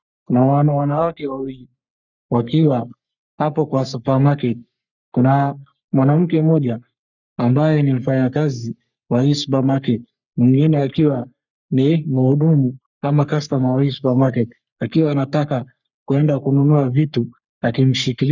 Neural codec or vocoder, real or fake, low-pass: codec, 44.1 kHz, 3.4 kbps, Pupu-Codec; fake; 7.2 kHz